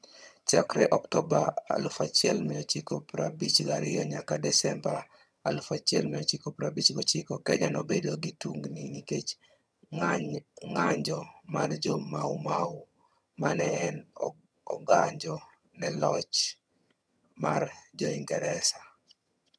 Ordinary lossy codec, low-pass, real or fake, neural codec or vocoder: none; none; fake; vocoder, 22.05 kHz, 80 mel bands, HiFi-GAN